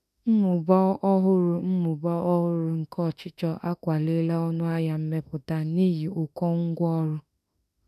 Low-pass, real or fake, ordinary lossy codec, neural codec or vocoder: 14.4 kHz; fake; none; autoencoder, 48 kHz, 32 numbers a frame, DAC-VAE, trained on Japanese speech